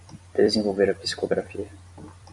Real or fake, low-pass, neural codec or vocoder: fake; 10.8 kHz; vocoder, 44.1 kHz, 128 mel bands every 256 samples, BigVGAN v2